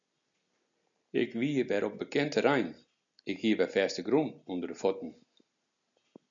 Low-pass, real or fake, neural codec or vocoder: 7.2 kHz; real; none